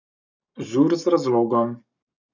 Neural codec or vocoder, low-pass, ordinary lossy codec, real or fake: codec, 44.1 kHz, 7.8 kbps, Pupu-Codec; 7.2 kHz; none; fake